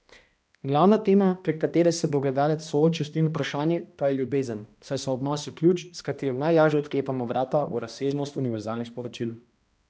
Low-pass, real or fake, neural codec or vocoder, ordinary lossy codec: none; fake; codec, 16 kHz, 1 kbps, X-Codec, HuBERT features, trained on balanced general audio; none